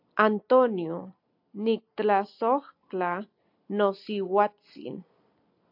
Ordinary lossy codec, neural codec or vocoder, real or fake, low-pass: AAC, 48 kbps; none; real; 5.4 kHz